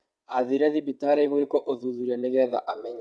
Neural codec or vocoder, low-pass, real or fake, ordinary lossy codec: vocoder, 22.05 kHz, 80 mel bands, WaveNeXt; none; fake; none